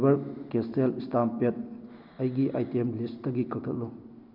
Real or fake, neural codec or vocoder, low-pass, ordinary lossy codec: real; none; 5.4 kHz; none